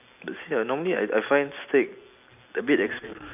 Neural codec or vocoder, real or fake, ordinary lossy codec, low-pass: none; real; none; 3.6 kHz